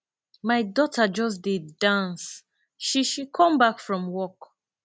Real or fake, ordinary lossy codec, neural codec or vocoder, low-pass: real; none; none; none